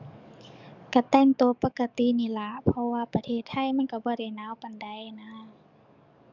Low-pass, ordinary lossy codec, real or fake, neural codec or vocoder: 7.2 kHz; none; fake; codec, 44.1 kHz, 7.8 kbps, DAC